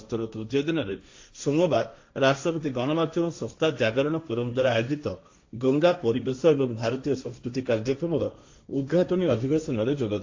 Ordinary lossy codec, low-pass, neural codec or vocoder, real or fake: AAC, 48 kbps; 7.2 kHz; codec, 16 kHz, 1.1 kbps, Voila-Tokenizer; fake